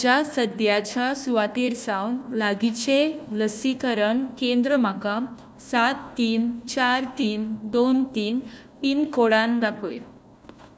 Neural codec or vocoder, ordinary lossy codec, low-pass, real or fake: codec, 16 kHz, 1 kbps, FunCodec, trained on Chinese and English, 50 frames a second; none; none; fake